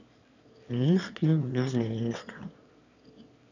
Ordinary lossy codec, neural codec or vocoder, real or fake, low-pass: none; autoencoder, 22.05 kHz, a latent of 192 numbers a frame, VITS, trained on one speaker; fake; 7.2 kHz